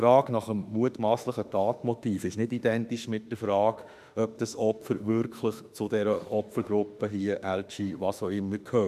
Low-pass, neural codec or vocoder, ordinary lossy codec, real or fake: 14.4 kHz; autoencoder, 48 kHz, 32 numbers a frame, DAC-VAE, trained on Japanese speech; none; fake